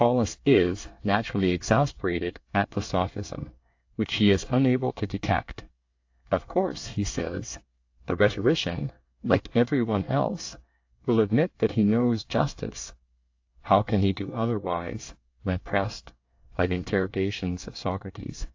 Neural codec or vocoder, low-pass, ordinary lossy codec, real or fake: codec, 24 kHz, 1 kbps, SNAC; 7.2 kHz; AAC, 48 kbps; fake